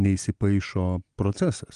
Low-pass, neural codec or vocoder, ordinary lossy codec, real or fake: 9.9 kHz; none; Opus, 24 kbps; real